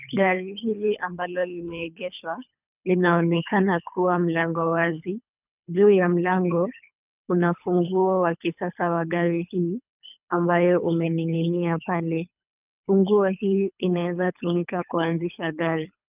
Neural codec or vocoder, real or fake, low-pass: codec, 24 kHz, 3 kbps, HILCodec; fake; 3.6 kHz